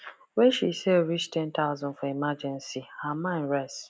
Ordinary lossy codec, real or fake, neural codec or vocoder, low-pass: none; real; none; none